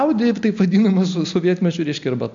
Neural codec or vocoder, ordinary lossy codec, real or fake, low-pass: none; MP3, 64 kbps; real; 7.2 kHz